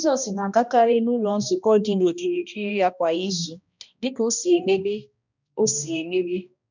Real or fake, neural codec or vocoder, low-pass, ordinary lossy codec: fake; codec, 16 kHz, 1 kbps, X-Codec, HuBERT features, trained on balanced general audio; 7.2 kHz; none